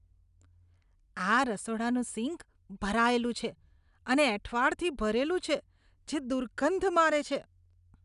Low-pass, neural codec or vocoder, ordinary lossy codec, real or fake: 10.8 kHz; none; none; real